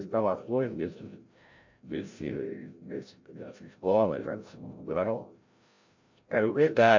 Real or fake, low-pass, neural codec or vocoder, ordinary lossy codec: fake; 7.2 kHz; codec, 16 kHz, 0.5 kbps, FreqCodec, larger model; MP3, 48 kbps